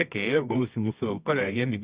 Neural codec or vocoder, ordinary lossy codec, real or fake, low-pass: codec, 24 kHz, 0.9 kbps, WavTokenizer, medium music audio release; Opus, 64 kbps; fake; 3.6 kHz